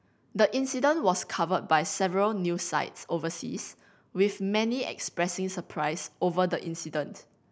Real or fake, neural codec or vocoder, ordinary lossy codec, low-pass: real; none; none; none